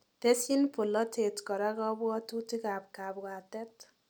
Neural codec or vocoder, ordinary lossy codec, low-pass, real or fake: none; none; none; real